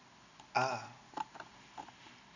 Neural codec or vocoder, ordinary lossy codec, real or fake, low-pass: none; none; real; 7.2 kHz